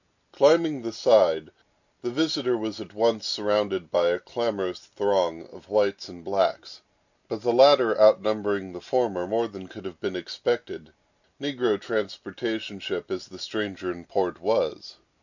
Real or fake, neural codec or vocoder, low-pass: real; none; 7.2 kHz